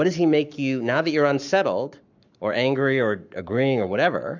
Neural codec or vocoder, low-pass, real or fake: codec, 44.1 kHz, 7.8 kbps, Pupu-Codec; 7.2 kHz; fake